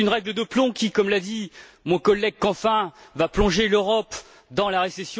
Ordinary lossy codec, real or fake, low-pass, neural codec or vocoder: none; real; none; none